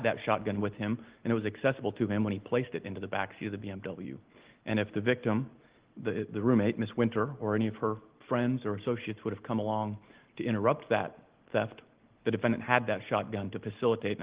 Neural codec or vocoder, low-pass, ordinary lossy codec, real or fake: none; 3.6 kHz; Opus, 16 kbps; real